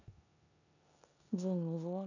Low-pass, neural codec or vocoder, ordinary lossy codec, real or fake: 7.2 kHz; codec, 16 kHz in and 24 kHz out, 0.9 kbps, LongCat-Audio-Codec, four codebook decoder; none; fake